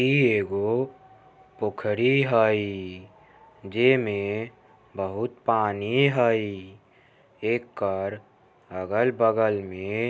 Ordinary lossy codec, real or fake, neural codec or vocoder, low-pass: none; real; none; none